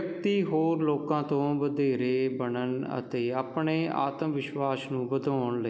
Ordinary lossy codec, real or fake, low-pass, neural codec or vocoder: none; real; none; none